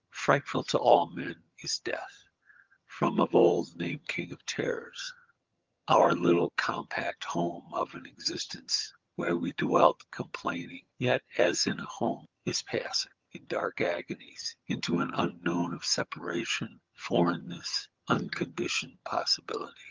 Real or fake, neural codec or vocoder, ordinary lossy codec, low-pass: fake; vocoder, 22.05 kHz, 80 mel bands, HiFi-GAN; Opus, 32 kbps; 7.2 kHz